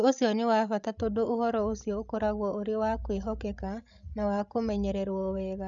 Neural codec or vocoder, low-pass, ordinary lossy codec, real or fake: codec, 16 kHz, 16 kbps, FreqCodec, larger model; 7.2 kHz; none; fake